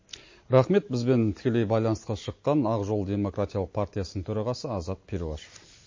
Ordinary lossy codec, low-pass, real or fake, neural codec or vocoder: MP3, 32 kbps; 7.2 kHz; real; none